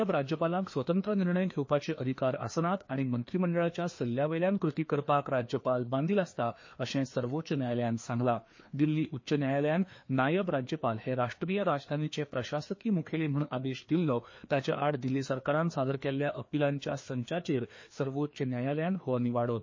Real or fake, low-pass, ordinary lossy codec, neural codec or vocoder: fake; 7.2 kHz; MP3, 32 kbps; codec, 16 kHz, 2 kbps, FreqCodec, larger model